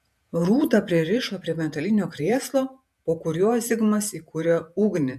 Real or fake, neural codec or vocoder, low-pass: fake; vocoder, 48 kHz, 128 mel bands, Vocos; 14.4 kHz